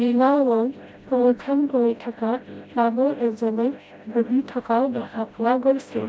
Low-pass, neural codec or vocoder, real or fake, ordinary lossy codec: none; codec, 16 kHz, 0.5 kbps, FreqCodec, smaller model; fake; none